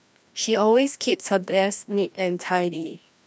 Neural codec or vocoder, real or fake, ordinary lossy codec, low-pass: codec, 16 kHz, 1 kbps, FreqCodec, larger model; fake; none; none